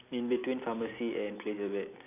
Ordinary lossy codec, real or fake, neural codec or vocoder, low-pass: none; real; none; 3.6 kHz